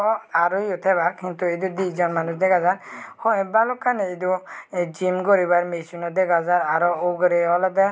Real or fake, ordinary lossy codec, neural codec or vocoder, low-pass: real; none; none; none